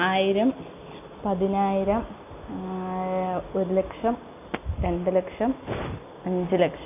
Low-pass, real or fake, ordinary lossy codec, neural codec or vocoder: 3.6 kHz; real; AAC, 32 kbps; none